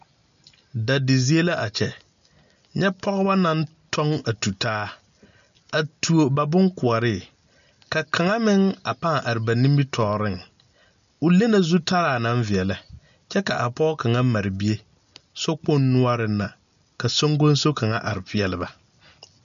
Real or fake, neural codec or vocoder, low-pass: real; none; 7.2 kHz